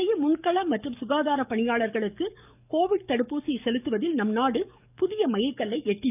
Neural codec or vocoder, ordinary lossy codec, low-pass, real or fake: codec, 44.1 kHz, 7.8 kbps, DAC; none; 3.6 kHz; fake